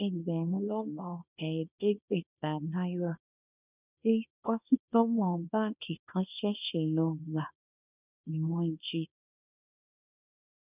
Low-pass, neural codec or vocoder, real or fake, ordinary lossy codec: 3.6 kHz; codec, 24 kHz, 0.9 kbps, WavTokenizer, small release; fake; none